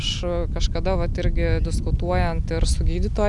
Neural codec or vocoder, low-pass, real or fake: none; 10.8 kHz; real